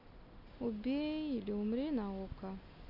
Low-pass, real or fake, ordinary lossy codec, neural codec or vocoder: 5.4 kHz; real; none; none